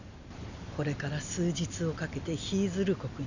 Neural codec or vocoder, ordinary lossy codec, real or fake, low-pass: none; none; real; 7.2 kHz